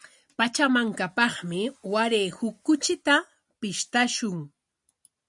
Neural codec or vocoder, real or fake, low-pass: none; real; 10.8 kHz